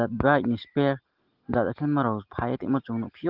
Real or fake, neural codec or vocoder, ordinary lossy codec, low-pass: real; none; Opus, 16 kbps; 5.4 kHz